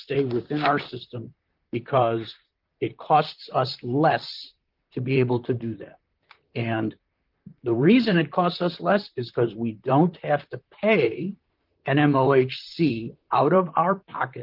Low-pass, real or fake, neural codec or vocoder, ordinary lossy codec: 5.4 kHz; fake; vocoder, 44.1 kHz, 128 mel bands, Pupu-Vocoder; Opus, 24 kbps